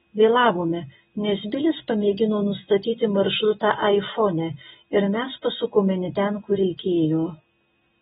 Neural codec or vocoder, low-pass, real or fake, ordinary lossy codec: none; 7.2 kHz; real; AAC, 16 kbps